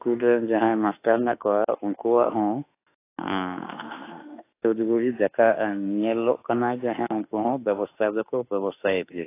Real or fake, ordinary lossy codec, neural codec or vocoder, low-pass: fake; AAC, 24 kbps; autoencoder, 48 kHz, 32 numbers a frame, DAC-VAE, trained on Japanese speech; 3.6 kHz